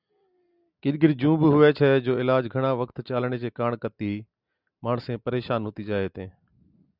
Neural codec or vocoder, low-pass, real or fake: none; 5.4 kHz; real